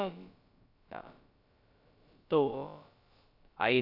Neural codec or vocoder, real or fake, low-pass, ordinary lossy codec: codec, 16 kHz, about 1 kbps, DyCAST, with the encoder's durations; fake; 5.4 kHz; none